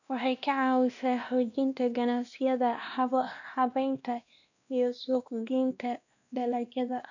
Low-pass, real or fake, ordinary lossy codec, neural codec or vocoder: 7.2 kHz; fake; none; codec, 16 kHz, 1 kbps, X-Codec, WavLM features, trained on Multilingual LibriSpeech